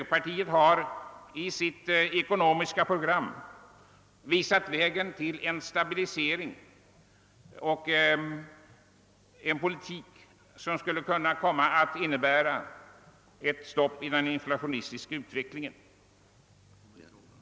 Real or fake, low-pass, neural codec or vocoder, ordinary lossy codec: real; none; none; none